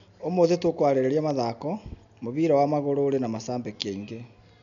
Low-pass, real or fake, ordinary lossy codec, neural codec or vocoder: 7.2 kHz; real; none; none